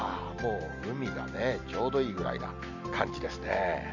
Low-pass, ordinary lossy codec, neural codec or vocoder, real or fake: 7.2 kHz; none; none; real